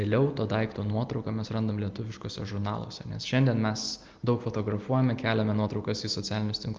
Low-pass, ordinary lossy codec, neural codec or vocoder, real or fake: 7.2 kHz; Opus, 32 kbps; none; real